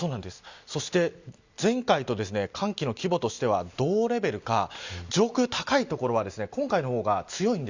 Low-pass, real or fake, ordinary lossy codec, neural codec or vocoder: 7.2 kHz; real; Opus, 64 kbps; none